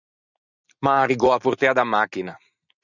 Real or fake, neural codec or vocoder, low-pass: real; none; 7.2 kHz